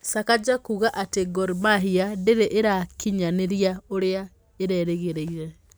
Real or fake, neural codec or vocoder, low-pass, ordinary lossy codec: real; none; none; none